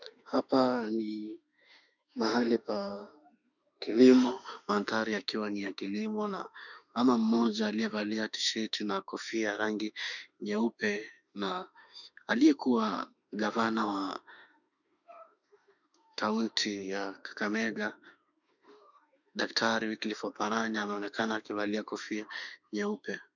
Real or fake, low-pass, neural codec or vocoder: fake; 7.2 kHz; autoencoder, 48 kHz, 32 numbers a frame, DAC-VAE, trained on Japanese speech